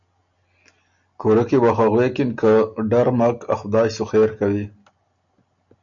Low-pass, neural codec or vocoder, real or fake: 7.2 kHz; none; real